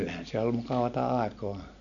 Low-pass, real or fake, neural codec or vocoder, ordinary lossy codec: 7.2 kHz; real; none; none